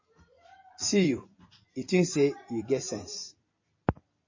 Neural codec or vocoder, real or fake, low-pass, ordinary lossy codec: vocoder, 44.1 kHz, 128 mel bands every 256 samples, BigVGAN v2; fake; 7.2 kHz; MP3, 32 kbps